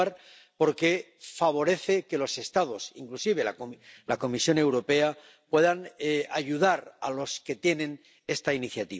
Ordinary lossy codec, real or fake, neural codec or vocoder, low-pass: none; real; none; none